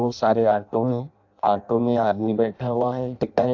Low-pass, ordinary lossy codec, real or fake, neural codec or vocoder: 7.2 kHz; AAC, 48 kbps; fake; codec, 16 kHz in and 24 kHz out, 0.6 kbps, FireRedTTS-2 codec